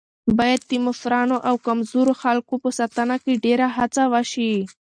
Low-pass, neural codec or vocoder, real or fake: 9.9 kHz; none; real